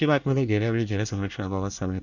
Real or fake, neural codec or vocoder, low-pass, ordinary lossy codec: fake; codec, 24 kHz, 1 kbps, SNAC; 7.2 kHz; none